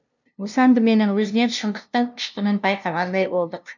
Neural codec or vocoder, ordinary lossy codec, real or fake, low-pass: codec, 16 kHz, 0.5 kbps, FunCodec, trained on LibriTTS, 25 frames a second; none; fake; 7.2 kHz